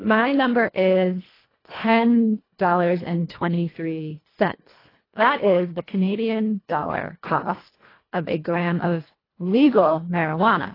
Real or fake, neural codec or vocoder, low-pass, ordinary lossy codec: fake; codec, 24 kHz, 1.5 kbps, HILCodec; 5.4 kHz; AAC, 24 kbps